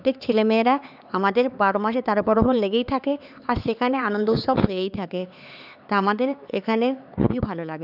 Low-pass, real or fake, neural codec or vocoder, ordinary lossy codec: 5.4 kHz; fake; codec, 16 kHz, 4 kbps, X-Codec, HuBERT features, trained on LibriSpeech; none